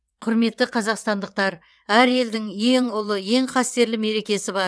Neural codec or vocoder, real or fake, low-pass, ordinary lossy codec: vocoder, 22.05 kHz, 80 mel bands, WaveNeXt; fake; none; none